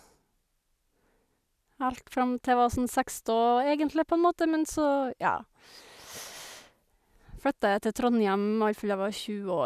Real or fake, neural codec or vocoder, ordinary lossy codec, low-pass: real; none; none; 14.4 kHz